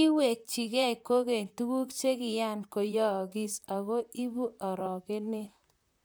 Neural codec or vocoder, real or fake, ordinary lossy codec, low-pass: vocoder, 44.1 kHz, 128 mel bands, Pupu-Vocoder; fake; none; none